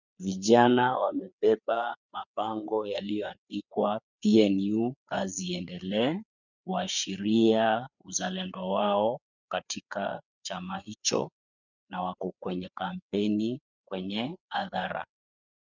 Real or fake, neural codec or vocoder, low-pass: fake; codec, 16 kHz, 4 kbps, FreqCodec, larger model; 7.2 kHz